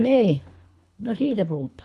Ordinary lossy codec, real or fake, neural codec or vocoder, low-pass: none; fake; codec, 24 kHz, 3 kbps, HILCodec; none